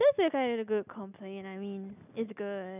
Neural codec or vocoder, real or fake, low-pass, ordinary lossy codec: none; real; 3.6 kHz; none